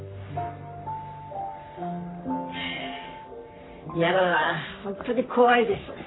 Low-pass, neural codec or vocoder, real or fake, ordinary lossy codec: 7.2 kHz; codec, 44.1 kHz, 3.4 kbps, Pupu-Codec; fake; AAC, 16 kbps